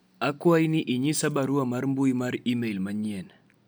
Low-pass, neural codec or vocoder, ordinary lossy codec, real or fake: none; none; none; real